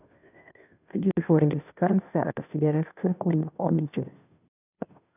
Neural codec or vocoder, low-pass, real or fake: codec, 16 kHz, 0.5 kbps, FunCodec, trained on Chinese and English, 25 frames a second; 3.6 kHz; fake